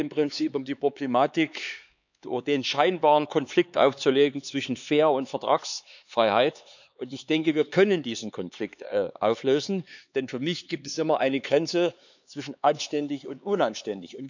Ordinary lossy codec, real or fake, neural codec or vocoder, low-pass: none; fake; codec, 16 kHz, 4 kbps, X-Codec, HuBERT features, trained on LibriSpeech; 7.2 kHz